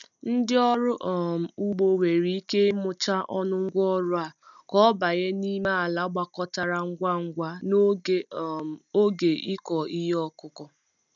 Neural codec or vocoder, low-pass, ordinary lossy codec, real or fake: none; 7.2 kHz; none; real